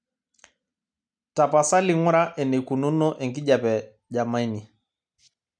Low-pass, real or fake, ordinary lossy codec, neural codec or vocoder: 9.9 kHz; real; MP3, 96 kbps; none